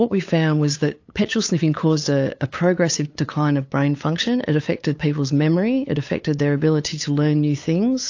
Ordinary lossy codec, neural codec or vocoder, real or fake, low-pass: AAC, 48 kbps; codec, 16 kHz, 8 kbps, FunCodec, trained on LibriTTS, 25 frames a second; fake; 7.2 kHz